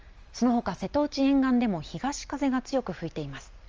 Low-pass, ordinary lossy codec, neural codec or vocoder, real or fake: 7.2 kHz; Opus, 24 kbps; none; real